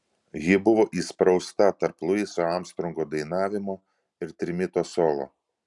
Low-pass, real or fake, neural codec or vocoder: 10.8 kHz; real; none